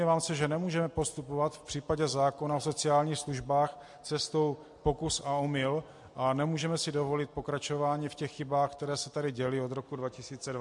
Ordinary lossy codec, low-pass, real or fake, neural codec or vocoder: MP3, 64 kbps; 10.8 kHz; real; none